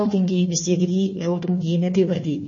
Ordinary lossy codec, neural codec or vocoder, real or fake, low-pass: MP3, 32 kbps; codec, 16 kHz, 1 kbps, FunCodec, trained on Chinese and English, 50 frames a second; fake; 7.2 kHz